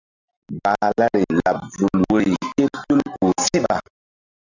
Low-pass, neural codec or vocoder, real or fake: 7.2 kHz; none; real